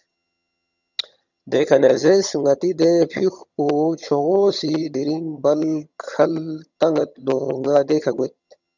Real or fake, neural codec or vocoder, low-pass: fake; vocoder, 22.05 kHz, 80 mel bands, HiFi-GAN; 7.2 kHz